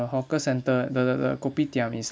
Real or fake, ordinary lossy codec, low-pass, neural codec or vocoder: real; none; none; none